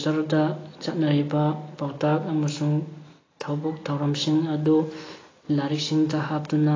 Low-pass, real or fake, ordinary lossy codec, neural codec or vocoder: 7.2 kHz; real; AAC, 32 kbps; none